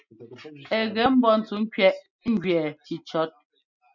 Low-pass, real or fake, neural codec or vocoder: 7.2 kHz; real; none